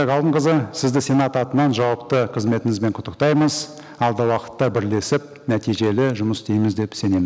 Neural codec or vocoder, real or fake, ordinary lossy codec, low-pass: none; real; none; none